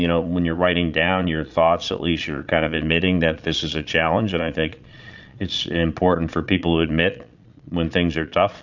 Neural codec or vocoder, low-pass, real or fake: vocoder, 22.05 kHz, 80 mel bands, Vocos; 7.2 kHz; fake